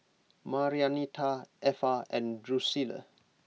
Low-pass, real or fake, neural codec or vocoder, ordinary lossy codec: none; real; none; none